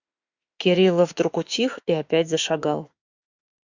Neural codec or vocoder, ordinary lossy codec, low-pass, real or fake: autoencoder, 48 kHz, 32 numbers a frame, DAC-VAE, trained on Japanese speech; Opus, 64 kbps; 7.2 kHz; fake